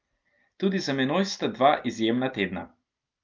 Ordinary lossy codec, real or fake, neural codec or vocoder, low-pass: Opus, 24 kbps; real; none; 7.2 kHz